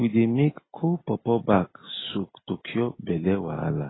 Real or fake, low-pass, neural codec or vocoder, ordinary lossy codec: real; 7.2 kHz; none; AAC, 16 kbps